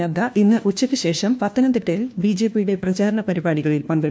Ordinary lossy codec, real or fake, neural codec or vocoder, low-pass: none; fake; codec, 16 kHz, 1 kbps, FunCodec, trained on LibriTTS, 50 frames a second; none